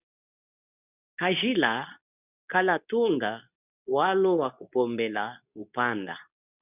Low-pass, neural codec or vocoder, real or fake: 3.6 kHz; codec, 24 kHz, 0.9 kbps, WavTokenizer, medium speech release version 2; fake